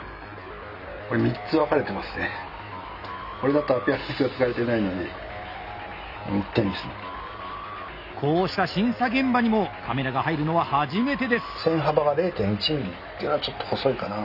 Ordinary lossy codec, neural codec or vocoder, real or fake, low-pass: none; none; real; 5.4 kHz